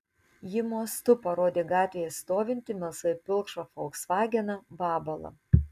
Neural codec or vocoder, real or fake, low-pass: none; real; 14.4 kHz